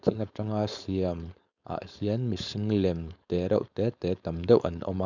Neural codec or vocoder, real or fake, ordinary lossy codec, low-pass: codec, 16 kHz, 4.8 kbps, FACodec; fake; none; 7.2 kHz